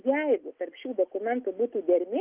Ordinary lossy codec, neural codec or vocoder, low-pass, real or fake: Opus, 32 kbps; none; 3.6 kHz; real